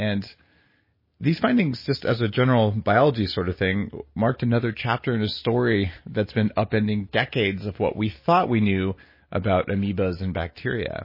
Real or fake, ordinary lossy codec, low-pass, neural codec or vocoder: real; MP3, 24 kbps; 5.4 kHz; none